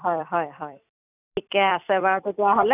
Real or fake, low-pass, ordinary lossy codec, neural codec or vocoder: fake; 3.6 kHz; none; vocoder, 44.1 kHz, 128 mel bands every 256 samples, BigVGAN v2